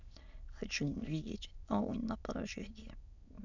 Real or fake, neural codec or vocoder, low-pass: fake; autoencoder, 22.05 kHz, a latent of 192 numbers a frame, VITS, trained on many speakers; 7.2 kHz